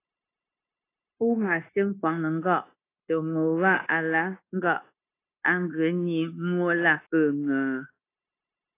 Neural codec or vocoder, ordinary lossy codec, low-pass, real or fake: codec, 16 kHz, 0.9 kbps, LongCat-Audio-Codec; AAC, 24 kbps; 3.6 kHz; fake